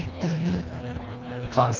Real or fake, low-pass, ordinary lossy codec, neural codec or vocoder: fake; 7.2 kHz; Opus, 24 kbps; codec, 24 kHz, 1.5 kbps, HILCodec